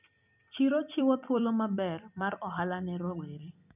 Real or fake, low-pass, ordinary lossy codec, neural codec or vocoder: fake; 3.6 kHz; none; vocoder, 44.1 kHz, 128 mel bands every 256 samples, BigVGAN v2